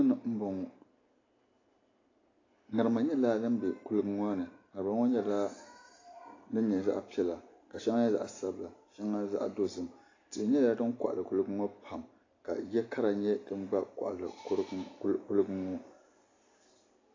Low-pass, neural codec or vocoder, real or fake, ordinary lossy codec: 7.2 kHz; none; real; AAC, 32 kbps